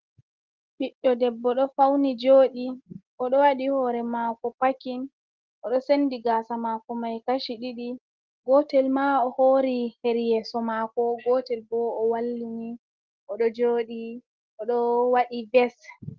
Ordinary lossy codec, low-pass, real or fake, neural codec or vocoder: Opus, 16 kbps; 7.2 kHz; real; none